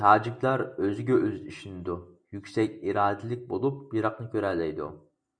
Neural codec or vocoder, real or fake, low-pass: none; real; 9.9 kHz